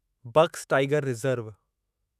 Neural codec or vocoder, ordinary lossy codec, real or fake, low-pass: autoencoder, 48 kHz, 128 numbers a frame, DAC-VAE, trained on Japanese speech; none; fake; 14.4 kHz